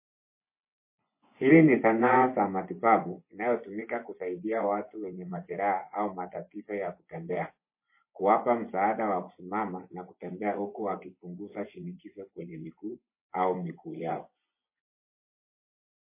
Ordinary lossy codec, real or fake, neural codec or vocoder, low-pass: MP3, 24 kbps; fake; vocoder, 24 kHz, 100 mel bands, Vocos; 3.6 kHz